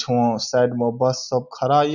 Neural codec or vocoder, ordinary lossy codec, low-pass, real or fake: none; none; 7.2 kHz; real